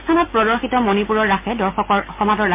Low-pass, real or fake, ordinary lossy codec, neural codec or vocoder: 3.6 kHz; real; MP3, 32 kbps; none